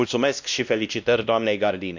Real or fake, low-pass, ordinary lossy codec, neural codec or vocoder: fake; 7.2 kHz; none; codec, 16 kHz, 1 kbps, X-Codec, WavLM features, trained on Multilingual LibriSpeech